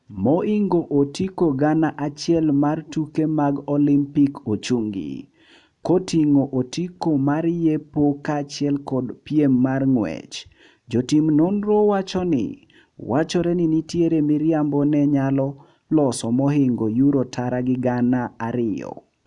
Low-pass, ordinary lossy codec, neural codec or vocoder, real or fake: 10.8 kHz; none; none; real